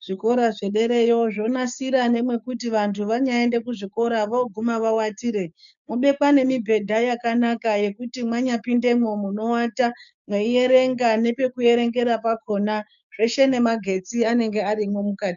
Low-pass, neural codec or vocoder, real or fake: 7.2 kHz; codec, 16 kHz, 6 kbps, DAC; fake